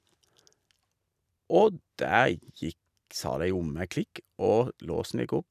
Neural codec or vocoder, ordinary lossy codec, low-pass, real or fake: none; none; 14.4 kHz; real